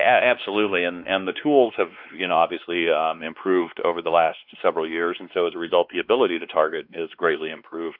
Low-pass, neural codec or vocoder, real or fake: 5.4 kHz; codec, 16 kHz, 2 kbps, X-Codec, WavLM features, trained on Multilingual LibriSpeech; fake